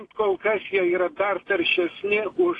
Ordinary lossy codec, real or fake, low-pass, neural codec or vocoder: AAC, 32 kbps; real; 10.8 kHz; none